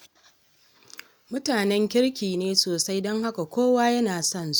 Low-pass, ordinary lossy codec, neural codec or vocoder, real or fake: none; none; none; real